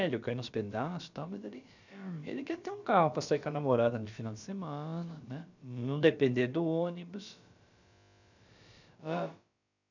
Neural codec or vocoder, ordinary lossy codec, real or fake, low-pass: codec, 16 kHz, about 1 kbps, DyCAST, with the encoder's durations; none; fake; 7.2 kHz